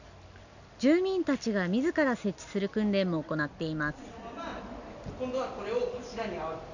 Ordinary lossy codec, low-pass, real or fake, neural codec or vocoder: none; 7.2 kHz; real; none